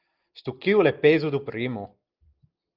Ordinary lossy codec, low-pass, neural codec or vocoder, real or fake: Opus, 24 kbps; 5.4 kHz; none; real